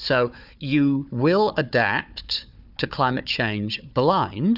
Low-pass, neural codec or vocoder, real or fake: 5.4 kHz; codec, 16 kHz, 4 kbps, FunCodec, trained on Chinese and English, 50 frames a second; fake